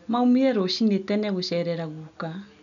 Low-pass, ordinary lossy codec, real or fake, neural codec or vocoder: 7.2 kHz; none; real; none